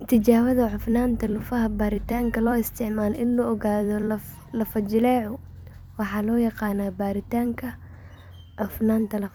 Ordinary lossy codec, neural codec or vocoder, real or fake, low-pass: none; vocoder, 44.1 kHz, 128 mel bands every 256 samples, BigVGAN v2; fake; none